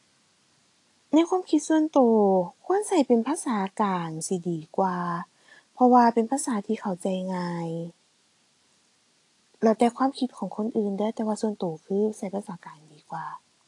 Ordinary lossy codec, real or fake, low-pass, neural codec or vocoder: AAC, 48 kbps; real; 10.8 kHz; none